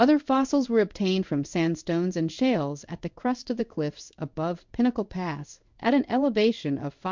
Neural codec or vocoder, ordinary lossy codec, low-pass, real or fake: codec, 16 kHz in and 24 kHz out, 1 kbps, XY-Tokenizer; MP3, 64 kbps; 7.2 kHz; fake